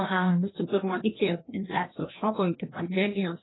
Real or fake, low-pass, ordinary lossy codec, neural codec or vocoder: fake; 7.2 kHz; AAC, 16 kbps; codec, 16 kHz, 1 kbps, FreqCodec, larger model